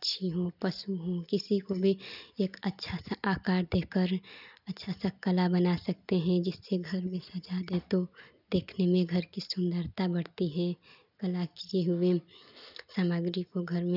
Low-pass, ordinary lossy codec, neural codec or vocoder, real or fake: 5.4 kHz; none; none; real